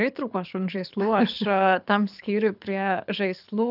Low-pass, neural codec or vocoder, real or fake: 5.4 kHz; codec, 24 kHz, 6 kbps, HILCodec; fake